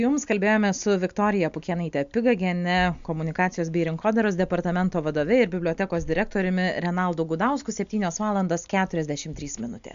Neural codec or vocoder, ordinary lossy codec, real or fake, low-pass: none; MP3, 64 kbps; real; 7.2 kHz